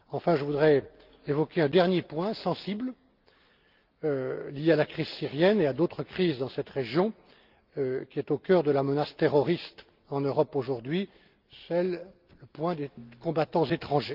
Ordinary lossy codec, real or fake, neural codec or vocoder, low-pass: Opus, 32 kbps; real; none; 5.4 kHz